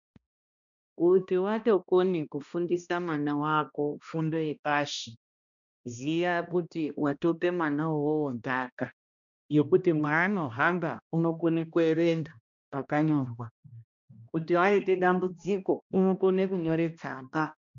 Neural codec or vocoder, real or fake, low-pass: codec, 16 kHz, 1 kbps, X-Codec, HuBERT features, trained on balanced general audio; fake; 7.2 kHz